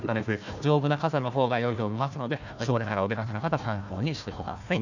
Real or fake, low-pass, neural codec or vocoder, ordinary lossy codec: fake; 7.2 kHz; codec, 16 kHz, 1 kbps, FunCodec, trained on Chinese and English, 50 frames a second; none